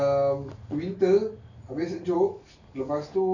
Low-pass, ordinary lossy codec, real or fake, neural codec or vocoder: 7.2 kHz; none; real; none